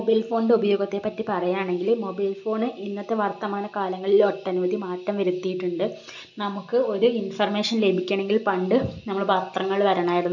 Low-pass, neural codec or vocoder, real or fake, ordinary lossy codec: 7.2 kHz; none; real; none